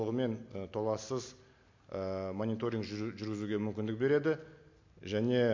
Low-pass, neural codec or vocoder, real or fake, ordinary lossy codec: 7.2 kHz; none; real; MP3, 48 kbps